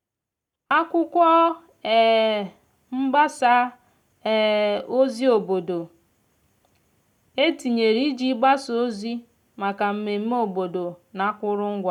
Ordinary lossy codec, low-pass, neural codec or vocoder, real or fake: none; 19.8 kHz; none; real